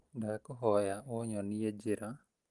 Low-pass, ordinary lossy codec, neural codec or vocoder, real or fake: 10.8 kHz; Opus, 24 kbps; none; real